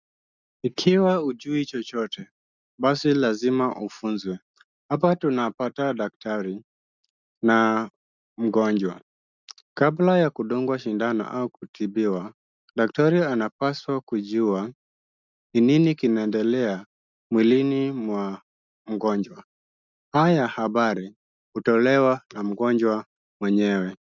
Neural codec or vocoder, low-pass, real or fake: none; 7.2 kHz; real